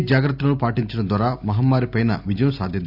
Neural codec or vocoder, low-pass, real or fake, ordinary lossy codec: none; 5.4 kHz; real; none